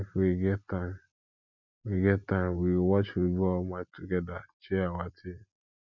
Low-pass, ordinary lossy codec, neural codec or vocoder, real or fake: 7.2 kHz; none; none; real